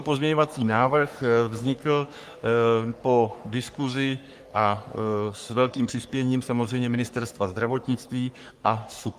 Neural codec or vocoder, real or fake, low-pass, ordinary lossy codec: codec, 44.1 kHz, 3.4 kbps, Pupu-Codec; fake; 14.4 kHz; Opus, 32 kbps